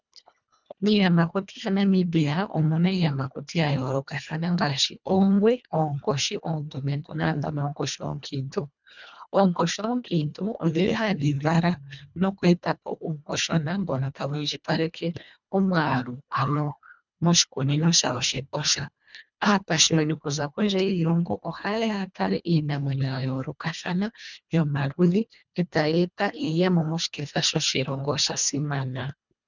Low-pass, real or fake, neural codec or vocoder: 7.2 kHz; fake; codec, 24 kHz, 1.5 kbps, HILCodec